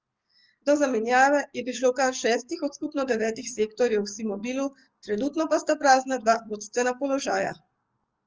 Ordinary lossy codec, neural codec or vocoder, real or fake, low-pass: Opus, 24 kbps; codec, 44.1 kHz, 7.8 kbps, DAC; fake; 7.2 kHz